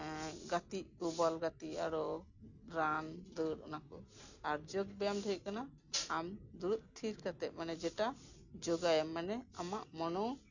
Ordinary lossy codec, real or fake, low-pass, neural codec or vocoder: none; real; 7.2 kHz; none